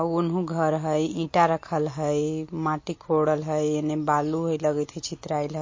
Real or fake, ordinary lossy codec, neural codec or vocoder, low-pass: real; MP3, 32 kbps; none; 7.2 kHz